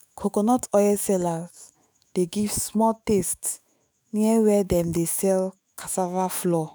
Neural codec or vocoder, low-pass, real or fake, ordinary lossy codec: autoencoder, 48 kHz, 128 numbers a frame, DAC-VAE, trained on Japanese speech; none; fake; none